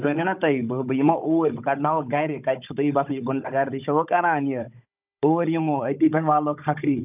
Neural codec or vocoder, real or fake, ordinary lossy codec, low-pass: codec, 16 kHz, 16 kbps, FunCodec, trained on Chinese and English, 50 frames a second; fake; none; 3.6 kHz